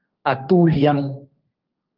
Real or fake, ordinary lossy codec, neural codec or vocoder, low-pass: fake; Opus, 24 kbps; codec, 16 kHz, 1.1 kbps, Voila-Tokenizer; 5.4 kHz